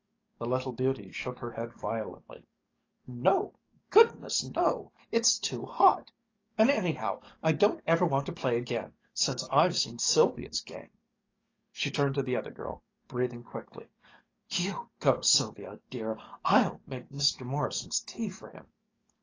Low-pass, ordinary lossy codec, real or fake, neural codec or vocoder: 7.2 kHz; AAC, 32 kbps; fake; codec, 44.1 kHz, 7.8 kbps, DAC